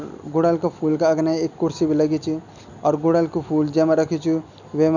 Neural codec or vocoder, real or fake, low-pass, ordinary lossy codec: none; real; 7.2 kHz; none